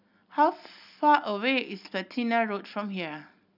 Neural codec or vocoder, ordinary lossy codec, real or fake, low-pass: none; none; real; 5.4 kHz